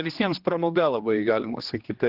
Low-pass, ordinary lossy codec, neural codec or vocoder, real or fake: 5.4 kHz; Opus, 16 kbps; codec, 16 kHz, 2 kbps, X-Codec, HuBERT features, trained on general audio; fake